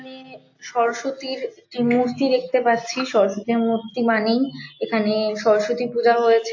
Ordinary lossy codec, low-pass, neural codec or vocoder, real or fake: AAC, 48 kbps; 7.2 kHz; none; real